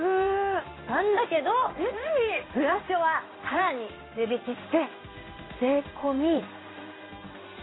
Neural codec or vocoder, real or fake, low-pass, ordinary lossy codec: codec, 16 kHz in and 24 kHz out, 1 kbps, XY-Tokenizer; fake; 7.2 kHz; AAC, 16 kbps